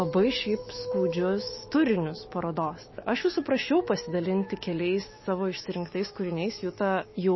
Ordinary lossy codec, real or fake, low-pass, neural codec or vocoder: MP3, 24 kbps; fake; 7.2 kHz; autoencoder, 48 kHz, 128 numbers a frame, DAC-VAE, trained on Japanese speech